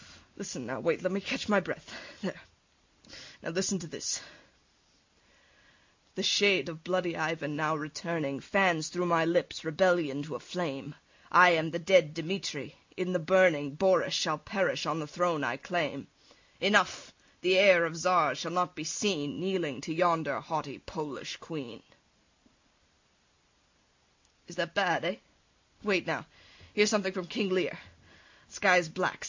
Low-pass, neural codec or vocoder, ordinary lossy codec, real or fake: 7.2 kHz; none; MP3, 64 kbps; real